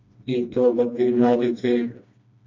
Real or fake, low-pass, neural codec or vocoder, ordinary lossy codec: fake; 7.2 kHz; codec, 16 kHz, 1 kbps, FreqCodec, smaller model; MP3, 48 kbps